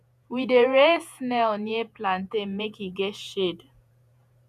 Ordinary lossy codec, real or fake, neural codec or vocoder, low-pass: none; fake; vocoder, 48 kHz, 128 mel bands, Vocos; 14.4 kHz